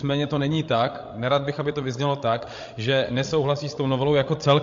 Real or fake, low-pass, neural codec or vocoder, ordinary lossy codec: fake; 7.2 kHz; codec, 16 kHz, 16 kbps, FreqCodec, larger model; MP3, 48 kbps